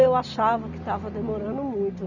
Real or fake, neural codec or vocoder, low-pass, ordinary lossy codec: real; none; 7.2 kHz; none